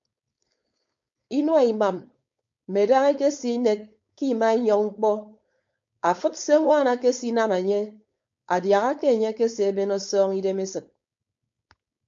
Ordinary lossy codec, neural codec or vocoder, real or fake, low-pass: MP3, 48 kbps; codec, 16 kHz, 4.8 kbps, FACodec; fake; 7.2 kHz